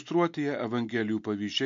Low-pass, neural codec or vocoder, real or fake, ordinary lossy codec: 7.2 kHz; none; real; MP3, 48 kbps